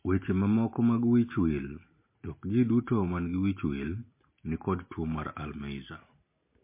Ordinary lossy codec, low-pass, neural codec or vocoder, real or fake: MP3, 24 kbps; 3.6 kHz; none; real